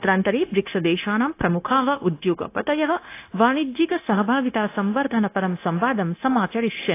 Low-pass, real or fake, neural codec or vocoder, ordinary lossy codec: 3.6 kHz; fake; codec, 16 kHz, 0.9 kbps, LongCat-Audio-Codec; AAC, 24 kbps